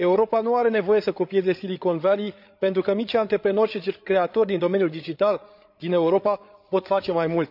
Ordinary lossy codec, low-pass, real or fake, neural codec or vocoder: none; 5.4 kHz; fake; codec, 16 kHz, 8 kbps, FreqCodec, larger model